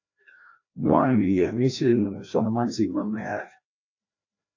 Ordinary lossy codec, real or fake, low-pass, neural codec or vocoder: AAC, 48 kbps; fake; 7.2 kHz; codec, 16 kHz, 1 kbps, FreqCodec, larger model